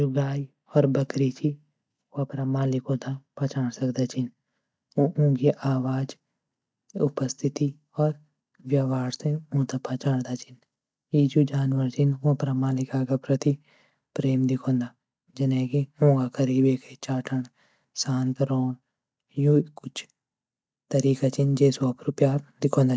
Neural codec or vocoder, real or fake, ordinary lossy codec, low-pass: none; real; none; none